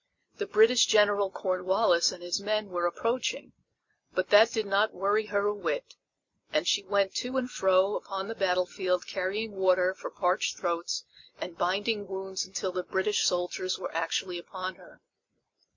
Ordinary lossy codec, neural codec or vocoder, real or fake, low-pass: MP3, 48 kbps; none; real; 7.2 kHz